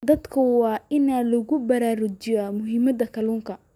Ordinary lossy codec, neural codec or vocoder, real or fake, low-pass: none; none; real; 19.8 kHz